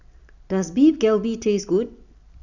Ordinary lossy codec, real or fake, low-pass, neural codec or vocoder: none; real; 7.2 kHz; none